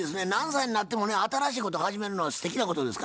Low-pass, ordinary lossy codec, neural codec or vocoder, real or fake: none; none; none; real